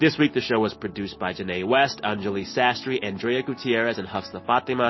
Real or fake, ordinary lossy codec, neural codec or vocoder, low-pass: real; MP3, 24 kbps; none; 7.2 kHz